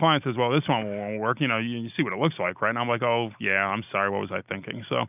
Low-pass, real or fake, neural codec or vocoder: 3.6 kHz; real; none